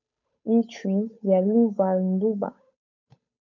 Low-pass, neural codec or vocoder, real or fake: 7.2 kHz; codec, 16 kHz, 8 kbps, FunCodec, trained on Chinese and English, 25 frames a second; fake